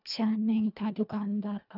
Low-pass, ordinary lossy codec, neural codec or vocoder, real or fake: 5.4 kHz; none; codec, 24 kHz, 1.5 kbps, HILCodec; fake